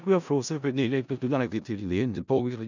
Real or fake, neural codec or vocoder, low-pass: fake; codec, 16 kHz in and 24 kHz out, 0.4 kbps, LongCat-Audio-Codec, four codebook decoder; 7.2 kHz